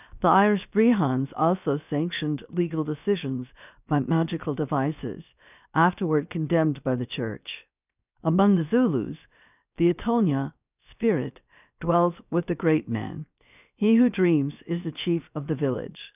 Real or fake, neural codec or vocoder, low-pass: fake; codec, 16 kHz, 0.7 kbps, FocalCodec; 3.6 kHz